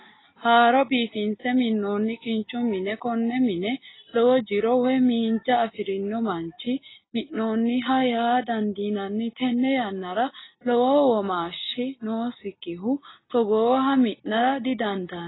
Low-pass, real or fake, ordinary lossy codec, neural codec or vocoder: 7.2 kHz; real; AAC, 16 kbps; none